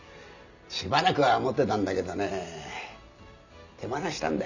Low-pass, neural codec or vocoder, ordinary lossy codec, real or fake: 7.2 kHz; none; none; real